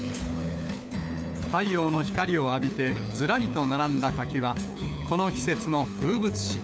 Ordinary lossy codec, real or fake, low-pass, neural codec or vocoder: none; fake; none; codec, 16 kHz, 4 kbps, FunCodec, trained on LibriTTS, 50 frames a second